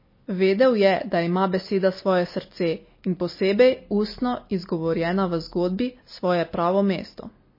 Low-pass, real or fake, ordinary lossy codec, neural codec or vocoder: 5.4 kHz; real; MP3, 24 kbps; none